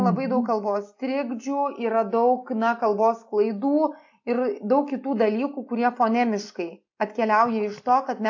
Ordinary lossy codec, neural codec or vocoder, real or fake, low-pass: AAC, 48 kbps; none; real; 7.2 kHz